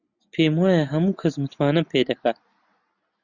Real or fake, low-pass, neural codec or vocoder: real; 7.2 kHz; none